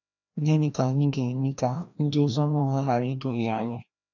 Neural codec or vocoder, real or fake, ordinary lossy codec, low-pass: codec, 16 kHz, 1 kbps, FreqCodec, larger model; fake; none; 7.2 kHz